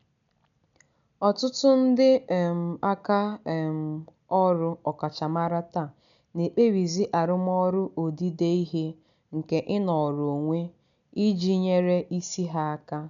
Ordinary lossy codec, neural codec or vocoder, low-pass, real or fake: none; none; 7.2 kHz; real